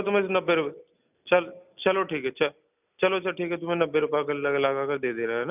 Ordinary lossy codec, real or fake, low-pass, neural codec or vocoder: none; real; 3.6 kHz; none